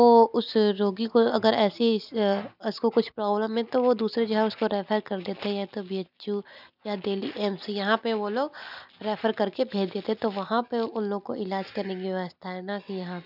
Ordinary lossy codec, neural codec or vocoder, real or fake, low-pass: none; none; real; 5.4 kHz